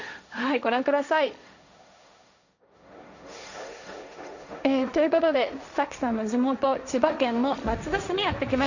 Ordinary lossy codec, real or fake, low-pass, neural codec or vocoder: none; fake; 7.2 kHz; codec, 16 kHz, 1.1 kbps, Voila-Tokenizer